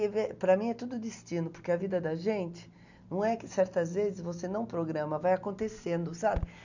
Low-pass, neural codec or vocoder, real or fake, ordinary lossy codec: 7.2 kHz; none; real; none